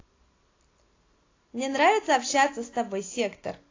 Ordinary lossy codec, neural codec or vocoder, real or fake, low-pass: AAC, 32 kbps; none; real; 7.2 kHz